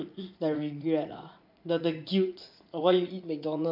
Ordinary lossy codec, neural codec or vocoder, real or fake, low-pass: MP3, 48 kbps; vocoder, 44.1 kHz, 80 mel bands, Vocos; fake; 5.4 kHz